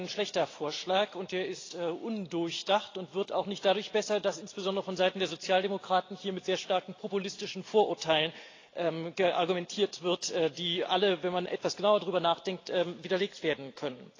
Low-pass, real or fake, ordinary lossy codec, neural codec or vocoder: 7.2 kHz; real; AAC, 32 kbps; none